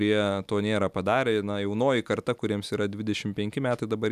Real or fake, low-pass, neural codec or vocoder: real; 14.4 kHz; none